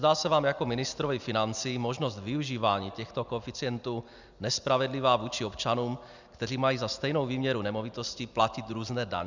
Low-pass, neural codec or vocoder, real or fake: 7.2 kHz; none; real